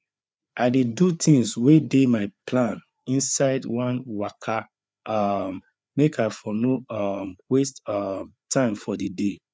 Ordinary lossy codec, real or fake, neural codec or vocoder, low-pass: none; fake; codec, 16 kHz, 4 kbps, FreqCodec, larger model; none